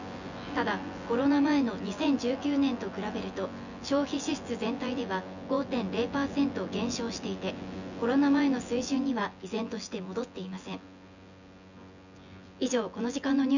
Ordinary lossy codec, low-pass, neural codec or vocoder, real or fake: none; 7.2 kHz; vocoder, 24 kHz, 100 mel bands, Vocos; fake